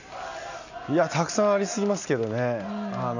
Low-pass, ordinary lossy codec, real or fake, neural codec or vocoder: 7.2 kHz; none; real; none